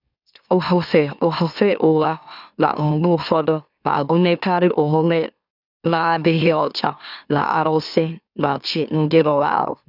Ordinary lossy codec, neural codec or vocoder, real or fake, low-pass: none; autoencoder, 44.1 kHz, a latent of 192 numbers a frame, MeloTTS; fake; 5.4 kHz